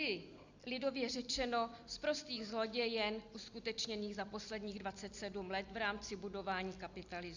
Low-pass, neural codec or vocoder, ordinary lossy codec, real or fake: 7.2 kHz; none; Opus, 64 kbps; real